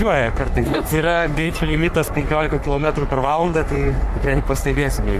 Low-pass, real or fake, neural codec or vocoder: 14.4 kHz; fake; codec, 44.1 kHz, 3.4 kbps, Pupu-Codec